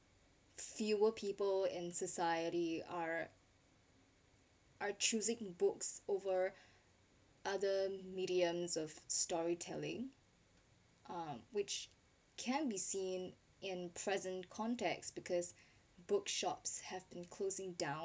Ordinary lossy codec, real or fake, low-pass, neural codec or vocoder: none; real; none; none